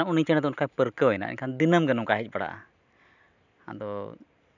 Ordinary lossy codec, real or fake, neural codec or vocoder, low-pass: none; real; none; 7.2 kHz